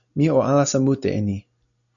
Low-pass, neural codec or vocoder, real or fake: 7.2 kHz; none; real